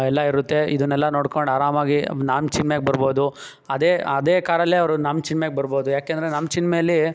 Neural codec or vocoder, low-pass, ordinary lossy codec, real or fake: none; none; none; real